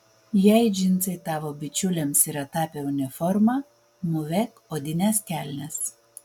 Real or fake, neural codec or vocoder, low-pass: real; none; 19.8 kHz